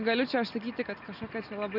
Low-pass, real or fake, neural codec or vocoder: 5.4 kHz; real; none